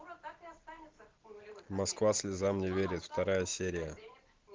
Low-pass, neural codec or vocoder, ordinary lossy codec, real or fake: 7.2 kHz; none; Opus, 16 kbps; real